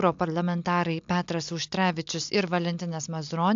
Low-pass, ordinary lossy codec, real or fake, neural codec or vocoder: 7.2 kHz; AAC, 64 kbps; real; none